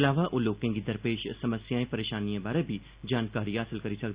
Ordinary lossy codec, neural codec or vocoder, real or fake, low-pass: Opus, 64 kbps; none; real; 3.6 kHz